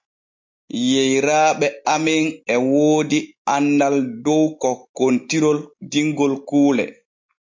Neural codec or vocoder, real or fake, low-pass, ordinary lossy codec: none; real; 7.2 kHz; MP3, 48 kbps